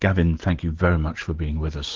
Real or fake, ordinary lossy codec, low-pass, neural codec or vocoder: real; Opus, 16 kbps; 7.2 kHz; none